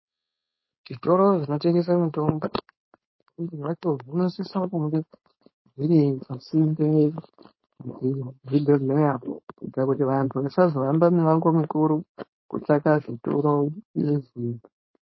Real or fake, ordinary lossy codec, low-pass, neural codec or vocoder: fake; MP3, 24 kbps; 7.2 kHz; codec, 16 kHz, 4.8 kbps, FACodec